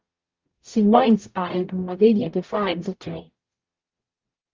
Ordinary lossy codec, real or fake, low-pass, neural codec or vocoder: Opus, 32 kbps; fake; 7.2 kHz; codec, 44.1 kHz, 0.9 kbps, DAC